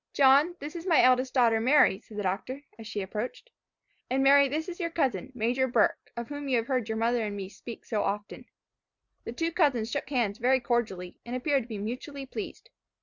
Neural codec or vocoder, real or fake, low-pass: none; real; 7.2 kHz